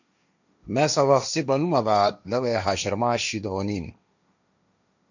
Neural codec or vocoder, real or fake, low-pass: codec, 16 kHz, 1.1 kbps, Voila-Tokenizer; fake; 7.2 kHz